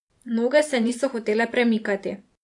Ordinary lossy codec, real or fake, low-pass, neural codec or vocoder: AAC, 64 kbps; fake; 10.8 kHz; vocoder, 44.1 kHz, 128 mel bands every 512 samples, BigVGAN v2